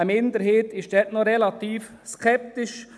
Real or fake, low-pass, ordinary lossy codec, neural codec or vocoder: real; none; none; none